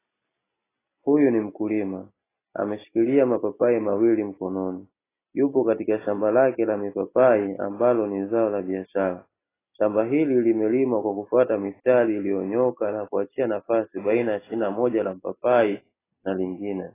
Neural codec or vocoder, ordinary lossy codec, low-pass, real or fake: none; AAC, 16 kbps; 3.6 kHz; real